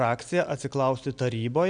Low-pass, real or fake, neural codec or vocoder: 9.9 kHz; real; none